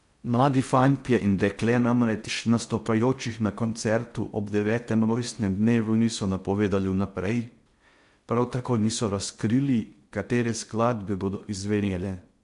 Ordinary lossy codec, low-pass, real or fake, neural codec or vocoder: MP3, 64 kbps; 10.8 kHz; fake; codec, 16 kHz in and 24 kHz out, 0.6 kbps, FocalCodec, streaming, 4096 codes